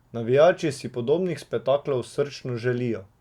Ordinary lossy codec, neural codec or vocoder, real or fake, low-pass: Opus, 64 kbps; none; real; 19.8 kHz